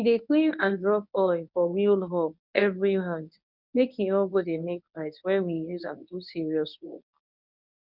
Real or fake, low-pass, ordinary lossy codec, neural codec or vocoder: fake; 5.4 kHz; none; codec, 24 kHz, 0.9 kbps, WavTokenizer, medium speech release version 1